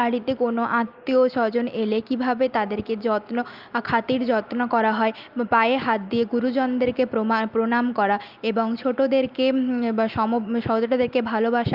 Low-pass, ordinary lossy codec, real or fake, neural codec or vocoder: 5.4 kHz; Opus, 24 kbps; real; none